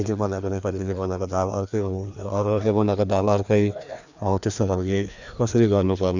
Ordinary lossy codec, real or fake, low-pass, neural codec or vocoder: none; fake; 7.2 kHz; codec, 16 kHz, 2 kbps, FreqCodec, larger model